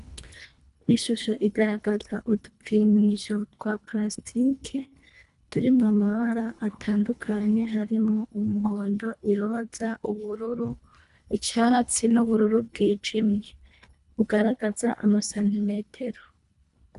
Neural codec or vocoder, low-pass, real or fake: codec, 24 kHz, 1.5 kbps, HILCodec; 10.8 kHz; fake